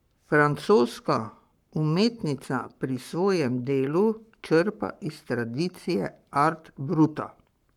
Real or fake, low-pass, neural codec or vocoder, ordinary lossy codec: fake; 19.8 kHz; codec, 44.1 kHz, 7.8 kbps, Pupu-Codec; none